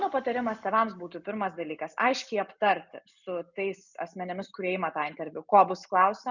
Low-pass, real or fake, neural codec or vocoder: 7.2 kHz; real; none